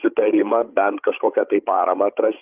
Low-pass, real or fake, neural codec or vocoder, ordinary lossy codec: 3.6 kHz; fake; codec, 16 kHz, 16 kbps, FreqCodec, larger model; Opus, 32 kbps